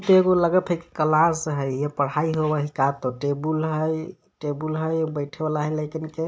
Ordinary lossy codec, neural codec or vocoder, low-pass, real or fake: none; none; none; real